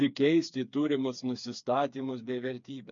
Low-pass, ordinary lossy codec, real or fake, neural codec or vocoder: 7.2 kHz; MP3, 48 kbps; fake; codec, 16 kHz, 4 kbps, FreqCodec, smaller model